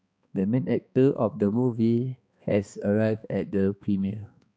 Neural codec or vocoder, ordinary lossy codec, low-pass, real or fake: codec, 16 kHz, 2 kbps, X-Codec, HuBERT features, trained on balanced general audio; none; none; fake